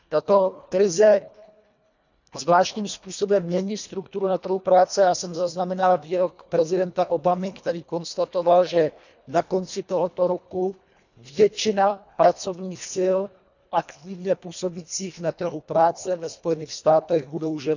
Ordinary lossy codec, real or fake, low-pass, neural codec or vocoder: none; fake; 7.2 kHz; codec, 24 kHz, 1.5 kbps, HILCodec